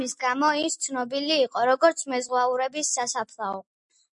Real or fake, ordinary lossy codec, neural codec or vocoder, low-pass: real; MP3, 64 kbps; none; 10.8 kHz